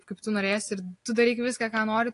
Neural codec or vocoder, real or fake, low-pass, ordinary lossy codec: none; real; 10.8 kHz; AAC, 48 kbps